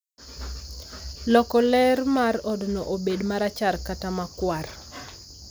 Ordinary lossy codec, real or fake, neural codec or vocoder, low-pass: none; real; none; none